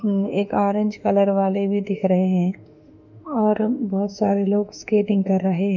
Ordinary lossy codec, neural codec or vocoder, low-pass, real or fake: none; autoencoder, 48 kHz, 32 numbers a frame, DAC-VAE, trained on Japanese speech; 7.2 kHz; fake